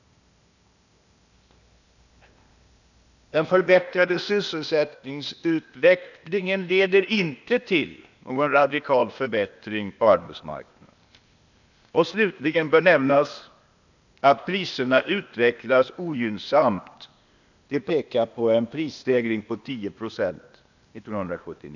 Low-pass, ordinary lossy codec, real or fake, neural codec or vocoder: 7.2 kHz; none; fake; codec, 16 kHz, 0.8 kbps, ZipCodec